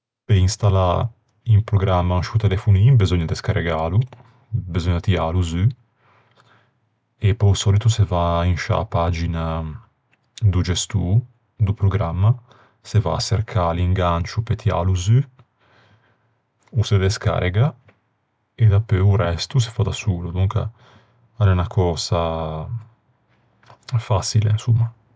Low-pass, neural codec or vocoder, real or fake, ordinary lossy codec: none; none; real; none